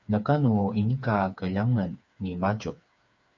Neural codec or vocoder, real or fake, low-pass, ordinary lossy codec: codec, 16 kHz, 4 kbps, FreqCodec, smaller model; fake; 7.2 kHz; MP3, 64 kbps